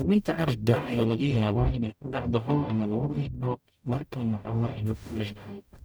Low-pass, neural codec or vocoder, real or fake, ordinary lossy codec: none; codec, 44.1 kHz, 0.9 kbps, DAC; fake; none